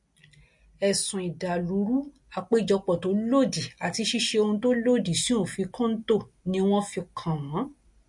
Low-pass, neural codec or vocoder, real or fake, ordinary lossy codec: 10.8 kHz; none; real; MP3, 48 kbps